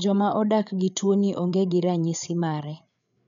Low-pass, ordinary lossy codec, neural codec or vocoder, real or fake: 7.2 kHz; none; codec, 16 kHz, 8 kbps, FreqCodec, larger model; fake